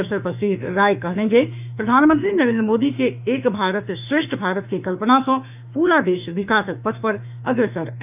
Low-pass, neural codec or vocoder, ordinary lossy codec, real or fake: 3.6 kHz; autoencoder, 48 kHz, 32 numbers a frame, DAC-VAE, trained on Japanese speech; none; fake